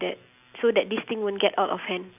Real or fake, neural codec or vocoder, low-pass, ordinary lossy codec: real; none; 3.6 kHz; none